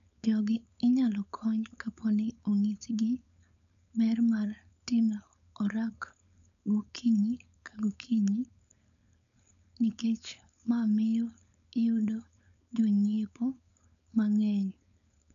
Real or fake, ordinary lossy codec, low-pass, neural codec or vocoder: fake; none; 7.2 kHz; codec, 16 kHz, 4.8 kbps, FACodec